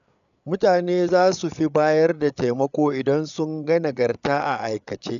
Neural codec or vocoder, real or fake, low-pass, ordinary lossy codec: codec, 16 kHz, 8 kbps, FreqCodec, larger model; fake; 7.2 kHz; none